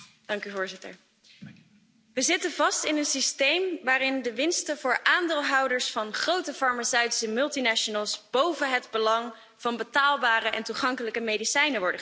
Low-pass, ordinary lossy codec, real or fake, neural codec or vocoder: none; none; real; none